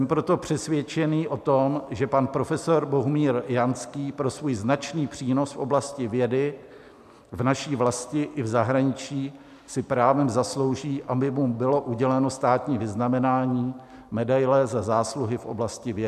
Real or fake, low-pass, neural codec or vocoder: real; 14.4 kHz; none